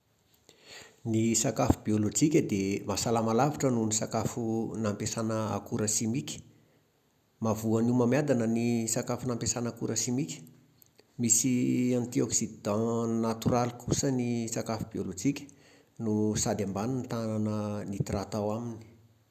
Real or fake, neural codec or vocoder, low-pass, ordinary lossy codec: real; none; 14.4 kHz; none